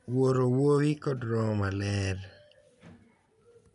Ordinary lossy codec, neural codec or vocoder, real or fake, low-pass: MP3, 96 kbps; none; real; 10.8 kHz